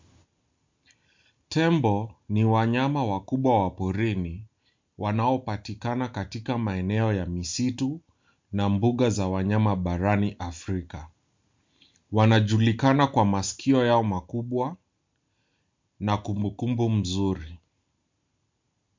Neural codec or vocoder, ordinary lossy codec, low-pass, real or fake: none; MP3, 64 kbps; 7.2 kHz; real